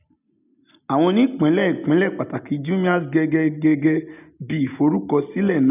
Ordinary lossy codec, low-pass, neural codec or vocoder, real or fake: none; 3.6 kHz; none; real